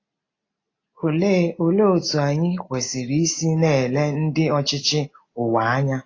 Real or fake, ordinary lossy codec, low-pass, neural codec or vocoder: real; AAC, 32 kbps; 7.2 kHz; none